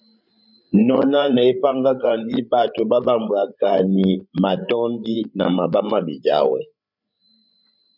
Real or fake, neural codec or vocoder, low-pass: fake; codec, 16 kHz, 8 kbps, FreqCodec, larger model; 5.4 kHz